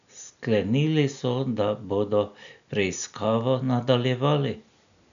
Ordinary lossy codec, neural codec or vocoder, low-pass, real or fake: none; none; 7.2 kHz; real